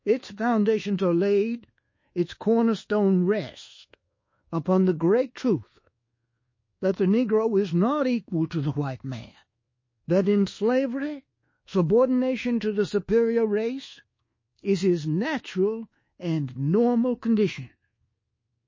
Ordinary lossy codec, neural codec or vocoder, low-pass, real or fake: MP3, 32 kbps; codec, 24 kHz, 1.2 kbps, DualCodec; 7.2 kHz; fake